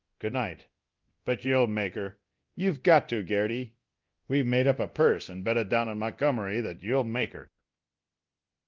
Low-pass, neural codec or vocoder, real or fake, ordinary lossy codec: 7.2 kHz; codec, 24 kHz, 0.9 kbps, DualCodec; fake; Opus, 32 kbps